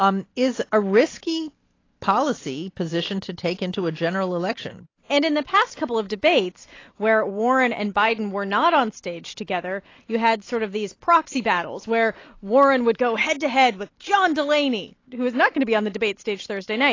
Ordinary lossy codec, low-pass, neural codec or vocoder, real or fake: AAC, 32 kbps; 7.2 kHz; none; real